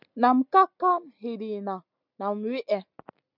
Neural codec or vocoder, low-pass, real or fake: none; 5.4 kHz; real